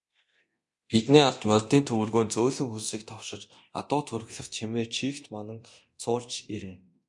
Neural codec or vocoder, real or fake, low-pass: codec, 24 kHz, 0.9 kbps, DualCodec; fake; 10.8 kHz